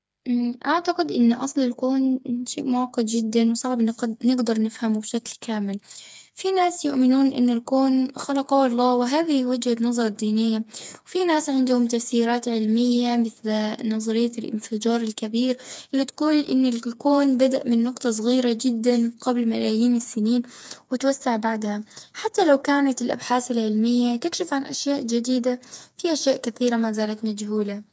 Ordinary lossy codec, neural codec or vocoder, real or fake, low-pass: none; codec, 16 kHz, 4 kbps, FreqCodec, smaller model; fake; none